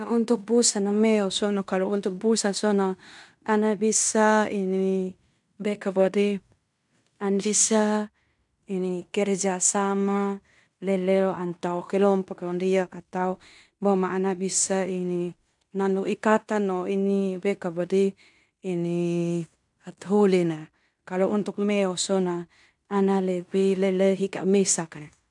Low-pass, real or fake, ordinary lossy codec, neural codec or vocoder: 10.8 kHz; fake; none; codec, 16 kHz in and 24 kHz out, 0.9 kbps, LongCat-Audio-Codec, fine tuned four codebook decoder